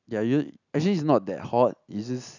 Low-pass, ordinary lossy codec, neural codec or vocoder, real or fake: 7.2 kHz; none; none; real